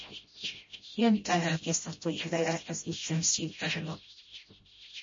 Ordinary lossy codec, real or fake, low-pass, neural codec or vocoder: MP3, 32 kbps; fake; 7.2 kHz; codec, 16 kHz, 0.5 kbps, FreqCodec, smaller model